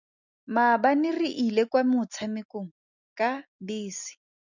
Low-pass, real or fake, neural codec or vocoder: 7.2 kHz; real; none